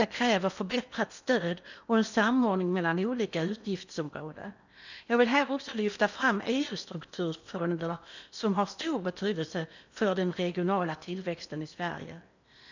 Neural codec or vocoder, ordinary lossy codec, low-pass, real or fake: codec, 16 kHz in and 24 kHz out, 0.8 kbps, FocalCodec, streaming, 65536 codes; none; 7.2 kHz; fake